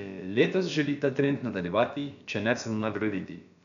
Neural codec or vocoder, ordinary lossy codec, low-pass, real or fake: codec, 16 kHz, about 1 kbps, DyCAST, with the encoder's durations; none; 7.2 kHz; fake